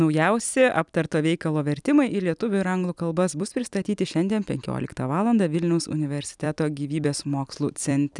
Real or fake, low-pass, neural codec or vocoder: fake; 10.8 kHz; vocoder, 44.1 kHz, 128 mel bands every 256 samples, BigVGAN v2